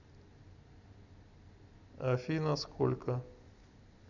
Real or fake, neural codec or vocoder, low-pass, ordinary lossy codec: real; none; 7.2 kHz; none